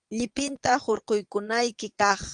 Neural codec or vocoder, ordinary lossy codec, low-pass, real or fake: vocoder, 22.05 kHz, 80 mel bands, Vocos; Opus, 32 kbps; 9.9 kHz; fake